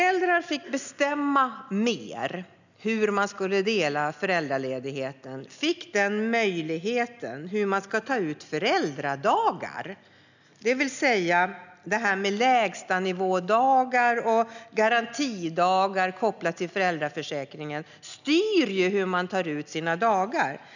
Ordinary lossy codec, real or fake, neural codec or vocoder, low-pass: none; real; none; 7.2 kHz